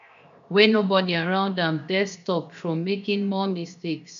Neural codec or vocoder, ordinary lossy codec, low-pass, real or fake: codec, 16 kHz, 0.7 kbps, FocalCodec; AAC, 64 kbps; 7.2 kHz; fake